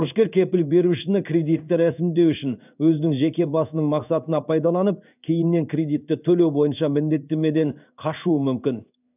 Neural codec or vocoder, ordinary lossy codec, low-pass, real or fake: codec, 16 kHz in and 24 kHz out, 1 kbps, XY-Tokenizer; none; 3.6 kHz; fake